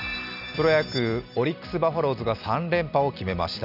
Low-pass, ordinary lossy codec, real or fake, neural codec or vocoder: 5.4 kHz; none; real; none